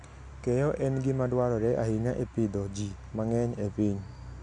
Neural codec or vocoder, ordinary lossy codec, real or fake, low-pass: none; none; real; 9.9 kHz